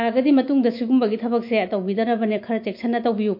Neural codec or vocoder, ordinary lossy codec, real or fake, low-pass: autoencoder, 48 kHz, 128 numbers a frame, DAC-VAE, trained on Japanese speech; MP3, 32 kbps; fake; 5.4 kHz